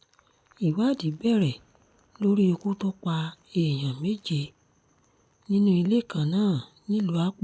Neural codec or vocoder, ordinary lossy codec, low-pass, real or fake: none; none; none; real